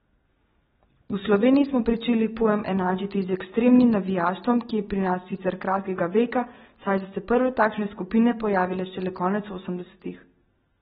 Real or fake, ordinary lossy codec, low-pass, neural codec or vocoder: real; AAC, 16 kbps; 19.8 kHz; none